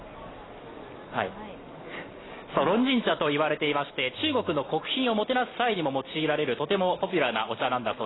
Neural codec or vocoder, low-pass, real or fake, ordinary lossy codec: none; 7.2 kHz; real; AAC, 16 kbps